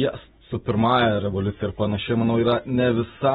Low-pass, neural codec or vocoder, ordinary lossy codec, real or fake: 19.8 kHz; none; AAC, 16 kbps; real